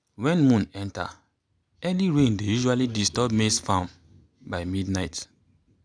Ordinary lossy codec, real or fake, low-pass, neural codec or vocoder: none; real; 9.9 kHz; none